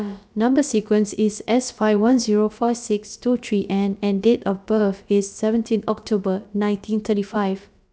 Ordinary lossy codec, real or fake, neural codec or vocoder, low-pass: none; fake; codec, 16 kHz, about 1 kbps, DyCAST, with the encoder's durations; none